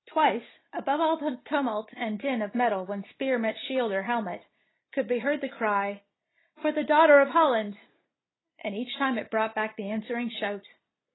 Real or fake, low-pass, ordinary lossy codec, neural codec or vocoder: real; 7.2 kHz; AAC, 16 kbps; none